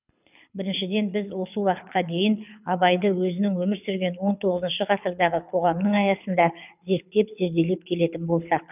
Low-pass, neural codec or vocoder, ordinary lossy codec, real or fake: 3.6 kHz; codec, 24 kHz, 6 kbps, HILCodec; none; fake